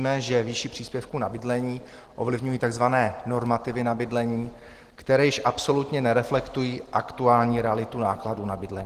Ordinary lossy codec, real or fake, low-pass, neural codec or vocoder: Opus, 16 kbps; real; 14.4 kHz; none